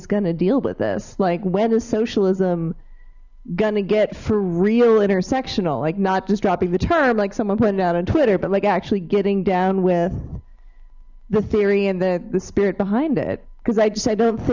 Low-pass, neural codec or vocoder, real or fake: 7.2 kHz; none; real